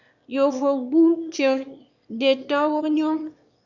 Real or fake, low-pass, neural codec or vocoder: fake; 7.2 kHz; autoencoder, 22.05 kHz, a latent of 192 numbers a frame, VITS, trained on one speaker